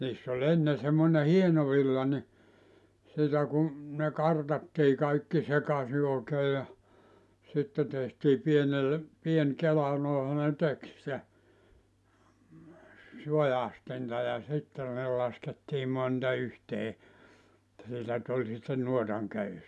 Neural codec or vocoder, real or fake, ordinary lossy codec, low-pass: none; real; none; none